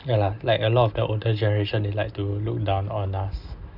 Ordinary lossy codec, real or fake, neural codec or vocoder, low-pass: none; real; none; 5.4 kHz